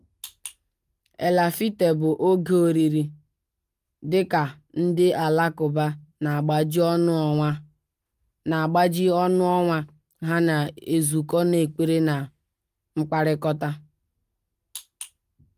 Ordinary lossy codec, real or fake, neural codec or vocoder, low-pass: Opus, 32 kbps; real; none; 14.4 kHz